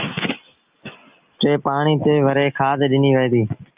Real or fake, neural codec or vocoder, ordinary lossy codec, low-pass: real; none; Opus, 24 kbps; 3.6 kHz